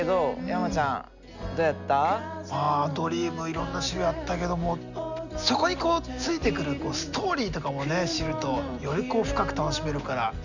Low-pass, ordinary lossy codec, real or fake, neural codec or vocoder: 7.2 kHz; none; real; none